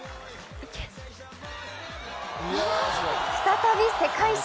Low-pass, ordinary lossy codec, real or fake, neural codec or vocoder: none; none; real; none